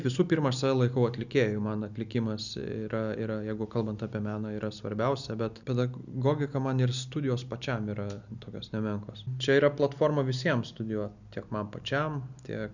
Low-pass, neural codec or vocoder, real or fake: 7.2 kHz; none; real